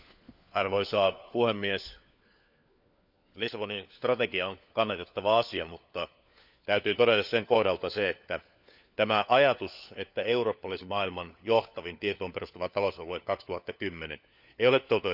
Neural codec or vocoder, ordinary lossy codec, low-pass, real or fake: codec, 16 kHz, 4 kbps, FunCodec, trained on LibriTTS, 50 frames a second; none; 5.4 kHz; fake